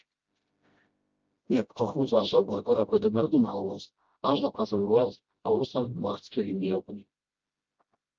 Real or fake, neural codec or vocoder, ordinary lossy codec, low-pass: fake; codec, 16 kHz, 0.5 kbps, FreqCodec, smaller model; Opus, 24 kbps; 7.2 kHz